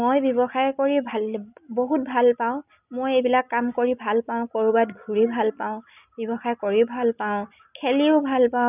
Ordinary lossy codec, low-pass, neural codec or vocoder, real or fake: none; 3.6 kHz; none; real